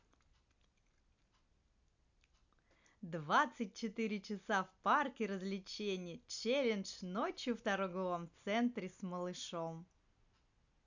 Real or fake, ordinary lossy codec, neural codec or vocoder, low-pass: real; none; none; 7.2 kHz